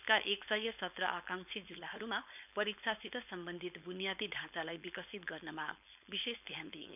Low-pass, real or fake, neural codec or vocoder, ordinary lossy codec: 3.6 kHz; fake; codec, 16 kHz, 4.8 kbps, FACodec; none